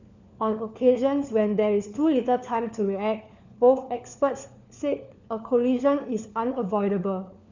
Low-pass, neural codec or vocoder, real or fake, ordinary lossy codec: 7.2 kHz; codec, 16 kHz, 4 kbps, FunCodec, trained on LibriTTS, 50 frames a second; fake; none